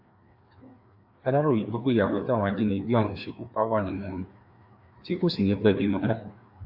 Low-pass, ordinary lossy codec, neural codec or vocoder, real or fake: 5.4 kHz; AAC, 48 kbps; codec, 16 kHz, 2 kbps, FreqCodec, larger model; fake